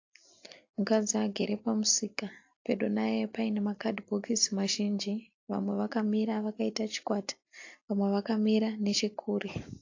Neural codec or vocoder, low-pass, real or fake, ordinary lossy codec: none; 7.2 kHz; real; AAC, 48 kbps